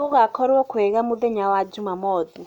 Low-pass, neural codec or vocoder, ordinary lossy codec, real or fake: 19.8 kHz; none; none; real